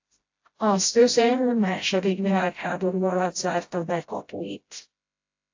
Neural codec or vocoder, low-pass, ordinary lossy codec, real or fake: codec, 16 kHz, 0.5 kbps, FreqCodec, smaller model; 7.2 kHz; AAC, 48 kbps; fake